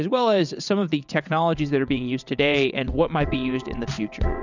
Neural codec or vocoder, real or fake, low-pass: vocoder, 22.05 kHz, 80 mel bands, WaveNeXt; fake; 7.2 kHz